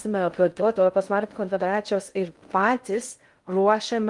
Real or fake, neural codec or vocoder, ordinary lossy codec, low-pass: fake; codec, 16 kHz in and 24 kHz out, 0.6 kbps, FocalCodec, streaming, 2048 codes; Opus, 32 kbps; 10.8 kHz